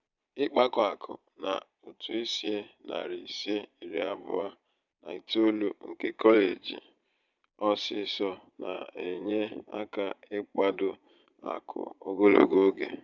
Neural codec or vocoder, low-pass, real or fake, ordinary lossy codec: vocoder, 22.05 kHz, 80 mel bands, Vocos; 7.2 kHz; fake; none